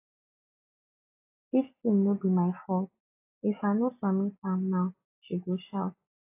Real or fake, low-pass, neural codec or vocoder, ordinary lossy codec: real; 3.6 kHz; none; AAC, 32 kbps